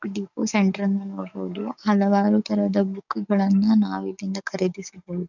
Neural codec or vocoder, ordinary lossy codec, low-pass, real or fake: codec, 44.1 kHz, 7.8 kbps, DAC; none; 7.2 kHz; fake